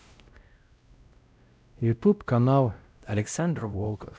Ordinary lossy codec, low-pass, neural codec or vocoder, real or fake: none; none; codec, 16 kHz, 0.5 kbps, X-Codec, WavLM features, trained on Multilingual LibriSpeech; fake